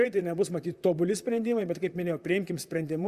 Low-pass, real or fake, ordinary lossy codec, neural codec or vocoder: 14.4 kHz; fake; Opus, 64 kbps; vocoder, 44.1 kHz, 128 mel bands, Pupu-Vocoder